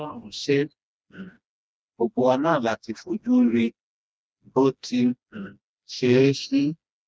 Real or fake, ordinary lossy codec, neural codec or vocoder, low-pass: fake; none; codec, 16 kHz, 1 kbps, FreqCodec, smaller model; none